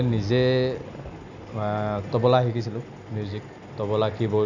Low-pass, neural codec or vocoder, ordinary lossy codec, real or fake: 7.2 kHz; none; none; real